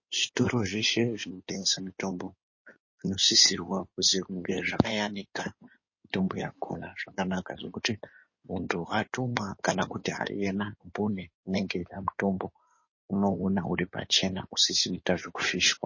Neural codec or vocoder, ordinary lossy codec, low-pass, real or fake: codec, 16 kHz in and 24 kHz out, 2.2 kbps, FireRedTTS-2 codec; MP3, 32 kbps; 7.2 kHz; fake